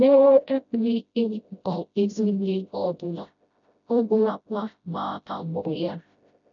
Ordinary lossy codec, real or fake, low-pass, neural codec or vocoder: none; fake; 7.2 kHz; codec, 16 kHz, 0.5 kbps, FreqCodec, smaller model